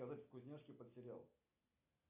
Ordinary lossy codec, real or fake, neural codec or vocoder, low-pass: MP3, 32 kbps; real; none; 3.6 kHz